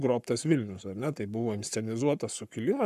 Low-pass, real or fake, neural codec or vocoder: 14.4 kHz; fake; codec, 44.1 kHz, 7.8 kbps, Pupu-Codec